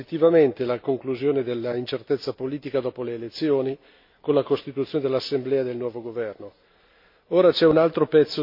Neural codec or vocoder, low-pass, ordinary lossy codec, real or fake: none; 5.4 kHz; MP3, 32 kbps; real